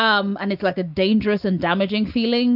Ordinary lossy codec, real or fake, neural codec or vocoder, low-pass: AAC, 48 kbps; real; none; 5.4 kHz